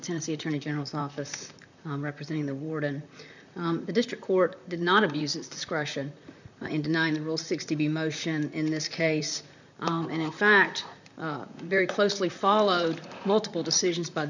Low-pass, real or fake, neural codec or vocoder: 7.2 kHz; fake; vocoder, 44.1 kHz, 128 mel bands, Pupu-Vocoder